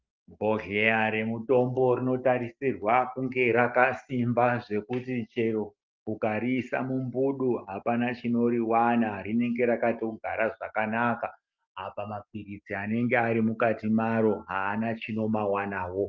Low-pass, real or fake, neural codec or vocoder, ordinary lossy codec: 7.2 kHz; real; none; Opus, 32 kbps